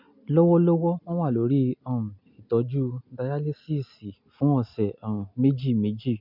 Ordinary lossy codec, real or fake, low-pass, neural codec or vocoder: MP3, 48 kbps; real; 5.4 kHz; none